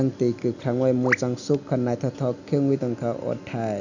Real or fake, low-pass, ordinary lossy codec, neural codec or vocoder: real; 7.2 kHz; none; none